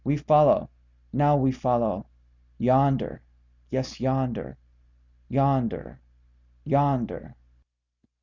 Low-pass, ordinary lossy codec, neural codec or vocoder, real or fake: 7.2 kHz; Opus, 64 kbps; none; real